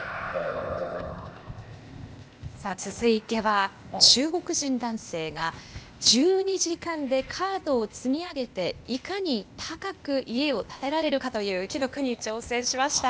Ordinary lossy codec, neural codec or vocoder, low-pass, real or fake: none; codec, 16 kHz, 0.8 kbps, ZipCodec; none; fake